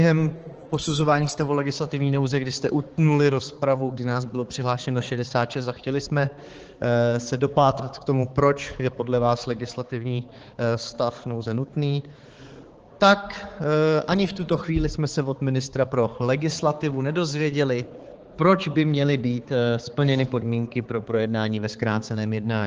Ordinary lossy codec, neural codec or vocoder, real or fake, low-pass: Opus, 16 kbps; codec, 16 kHz, 4 kbps, X-Codec, HuBERT features, trained on balanced general audio; fake; 7.2 kHz